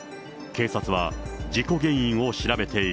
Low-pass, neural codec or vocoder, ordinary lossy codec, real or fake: none; none; none; real